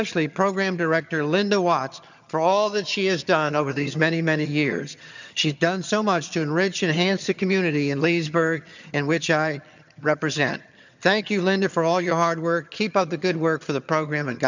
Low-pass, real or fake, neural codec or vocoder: 7.2 kHz; fake; vocoder, 22.05 kHz, 80 mel bands, HiFi-GAN